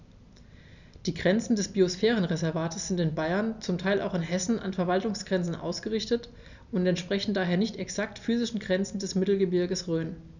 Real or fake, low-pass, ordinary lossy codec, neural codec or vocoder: real; 7.2 kHz; none; none